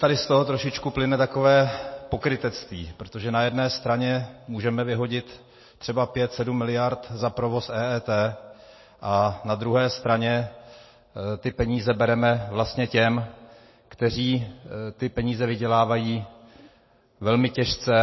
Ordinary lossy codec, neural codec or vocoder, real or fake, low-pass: MP3, 24 kbps; none; real; 7.2 kHz